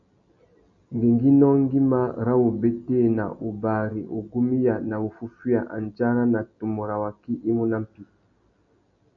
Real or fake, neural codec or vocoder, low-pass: real; none; 7.2 kHz